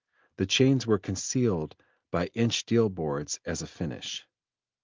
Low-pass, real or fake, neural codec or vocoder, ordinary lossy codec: 7.2 kHz; real; none; Opus, 32 kbps